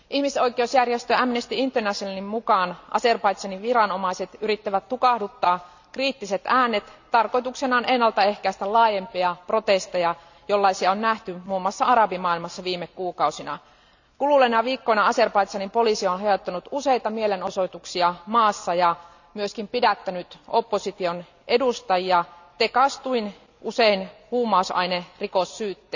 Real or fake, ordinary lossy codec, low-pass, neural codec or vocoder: real; none; 7.2 kHz; none